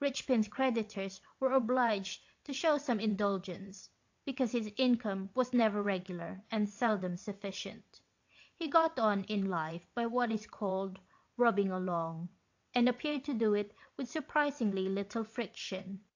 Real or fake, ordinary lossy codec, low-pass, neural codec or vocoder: fake; AAC, 48 kbps; 7.2 kHz; vocoder, 22.05 kHz, 80 mel bands, WaveNeXt